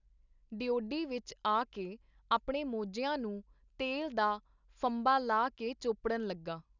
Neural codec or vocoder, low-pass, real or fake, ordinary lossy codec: none; 7.2 kHz; real; none